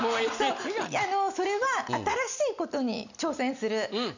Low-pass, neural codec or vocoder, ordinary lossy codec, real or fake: 7.2 kHz; none; none; real